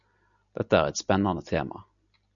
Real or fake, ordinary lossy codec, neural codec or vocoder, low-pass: real; MP3, 96 kbps; none; 7.2 kHz